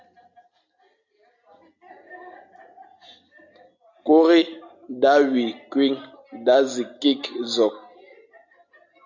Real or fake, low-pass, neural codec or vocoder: real; 7.2 kHz; none